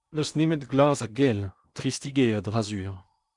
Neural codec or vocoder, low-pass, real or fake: codec, 16 kHz in and 24 kHz out, 0.8 kbps, FocalCodec, streaming, 65536 codes; 10.8 kHz; fake